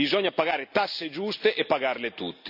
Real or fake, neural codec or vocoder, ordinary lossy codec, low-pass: real; none; none; 5.4 kHz